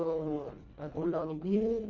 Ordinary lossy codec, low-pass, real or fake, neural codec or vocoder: none; 7.2 kHz; fake; codec, 24 kHz, 1.5 kbps, HILCodec